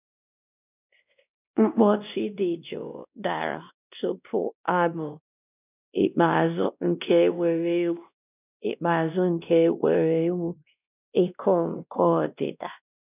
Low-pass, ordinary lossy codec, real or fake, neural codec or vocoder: 3.6 kHz; none; fake; codec, 16 kHz, 1 kbps, X-Codec, WavLM features, trained on Multilingual LibriSpeech